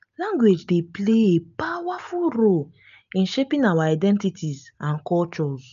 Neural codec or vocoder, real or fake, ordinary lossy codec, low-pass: none; real; none; 7.2 kHz